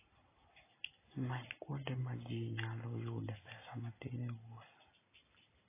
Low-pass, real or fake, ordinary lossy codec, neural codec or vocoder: 3.6 kHz; real; AAC, 16 kbps; none